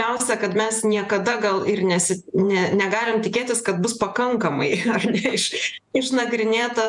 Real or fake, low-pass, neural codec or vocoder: fake; 10.8 kHz; vocoder, 48 kHz, 128 mel bands, Vocos